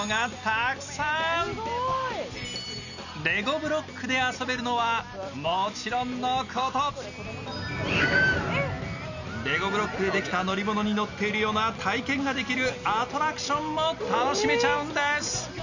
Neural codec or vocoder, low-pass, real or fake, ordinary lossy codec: none; 7.2 kHz; real; none